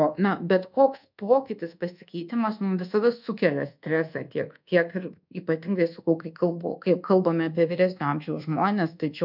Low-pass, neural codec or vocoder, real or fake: 5.4 kHz; codec, 24 kHz, 1.2 kbps, DualCodec; fake